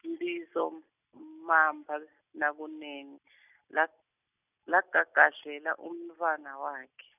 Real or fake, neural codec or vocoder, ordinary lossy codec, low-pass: real; none; none; 3.6 kHz